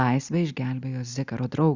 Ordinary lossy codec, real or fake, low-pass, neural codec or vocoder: Opus, 64 kbps; real; 7.2 kHz; none